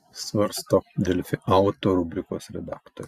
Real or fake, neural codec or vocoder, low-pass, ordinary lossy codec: real; none; 14.4 kHz; Opus, 64 kbps